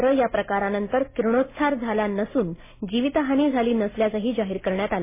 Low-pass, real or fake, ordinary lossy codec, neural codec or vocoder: 3.6 kHz; real; MP3, 16 kbps; none